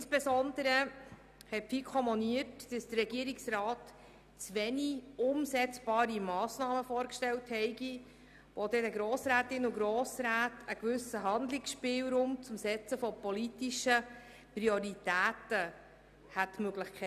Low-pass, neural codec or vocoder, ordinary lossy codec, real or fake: 14.4 kHz; none; none; real